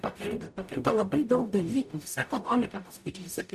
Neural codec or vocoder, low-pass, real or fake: codec, 44.1 kHz, 0.9 kbps, DAC; 14.4 kHz; fake